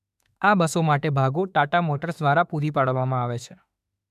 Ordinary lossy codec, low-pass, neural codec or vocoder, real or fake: none; 14.4 kHz; autoencoder, 48 kHz, 32 numbers a frame, DAC-VAE, trained on Japanese speech; fake